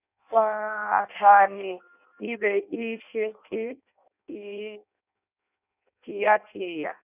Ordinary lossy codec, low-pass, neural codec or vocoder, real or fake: none; 3.6 kHz; codec, 16 kHz in and 24 kHz out, 0.6 kbps, FireRedTTS-2 codec; fake